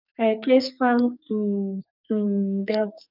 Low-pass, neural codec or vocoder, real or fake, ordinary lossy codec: 5.4 kHz; codec, 44.1 kHz, 2.6 kbps, SNAC; fake; none